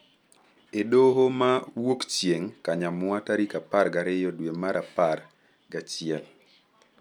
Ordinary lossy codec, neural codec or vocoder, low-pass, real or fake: none; none; 19.8 kHz; real